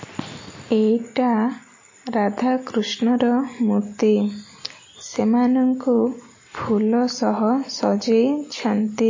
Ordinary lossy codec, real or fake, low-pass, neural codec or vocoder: MP3, 32 kbps; real; 7.2 kHz; none